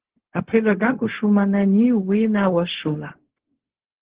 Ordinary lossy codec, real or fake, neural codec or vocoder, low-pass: Opus, 16 kbps; fake; codec, 16 kHz, 0.4 kbps, LongCat-Audio-Codec; 3.6 kHz